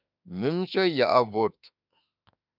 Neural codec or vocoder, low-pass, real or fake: autoencoder, 48 kHz, 32 numbers a frame, DAC-VAE, trained on Japanese speech; 5.4 kHz; fake